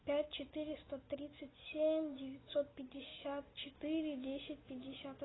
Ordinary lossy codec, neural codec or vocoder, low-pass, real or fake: AAC, 16 kbps; none; 7.2 kHz; real